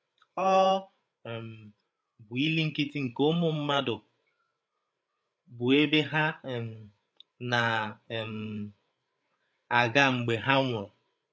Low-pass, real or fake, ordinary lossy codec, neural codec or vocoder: none; fake; none; codec, 16 kHz, 8 kbps, FreqCodec, larger model